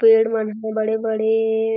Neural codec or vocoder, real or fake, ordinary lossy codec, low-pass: none; real; none; 5.4 kHz